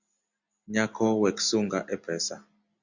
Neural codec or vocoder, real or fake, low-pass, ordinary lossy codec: none; real; 7.2 kHz; Opus, 64 kbps